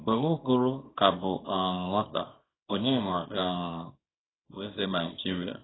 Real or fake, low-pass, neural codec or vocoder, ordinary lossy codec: fake; 7.2 kHz; codec, 24 kHz, 0.9 kbps, WavTokenizer, small release; AAC, 16 kbps